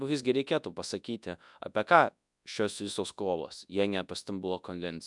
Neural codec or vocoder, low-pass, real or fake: codec, 24 kHz, 0.9 kbps, WavTokenizer, large speech release; 10.8 kHz; fake